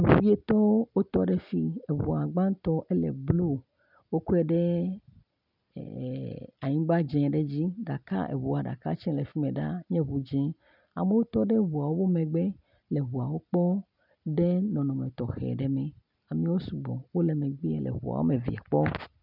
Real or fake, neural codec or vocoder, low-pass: real; none; 5.4 kHz